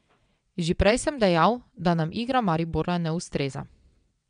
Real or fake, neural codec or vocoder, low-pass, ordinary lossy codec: fake; vocoder, 22.05 kHz, 80 mel bands, WaveNeXt; 9.9 kHz; MP3, 96 kbps